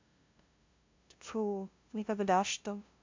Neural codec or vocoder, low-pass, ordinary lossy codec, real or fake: codec, 16 kHz, 0.5 kbps, FunCodec, trained on LibriTTS, 25 frames a second; 7.2 kHz; MP3, 64 kbps; fake